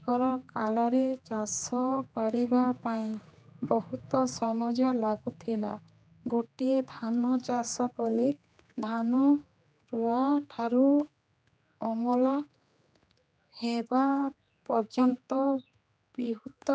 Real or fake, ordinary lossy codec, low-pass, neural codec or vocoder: fake; none; none; codec, 16 kHz, 2 kbps, X-Codec, HuBERT features, trained on general audio